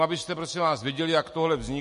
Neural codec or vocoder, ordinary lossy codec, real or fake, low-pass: none; MP3, 48 kbps; real; 10.8 kHz